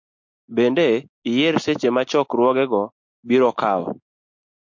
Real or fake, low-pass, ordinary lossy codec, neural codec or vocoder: real; 7.2 kHz; MP3, 48 kbps; none